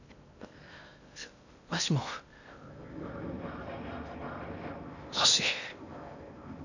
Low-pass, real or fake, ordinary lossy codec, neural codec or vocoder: 7.2 kHz; fake; none; codec, 16 kHz in and 24 kHz out, 0.8 kbps, FocalCodec, streaming, 65536 codes